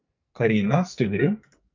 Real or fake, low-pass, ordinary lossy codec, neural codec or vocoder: fake; 7.2 kHz; MP3, 64 kbps; codec, 44.1 kHz, 2.6 kbps, SNAC